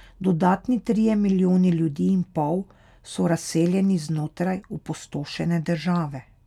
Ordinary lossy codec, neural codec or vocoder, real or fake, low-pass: none; none; real; 19.8 kHz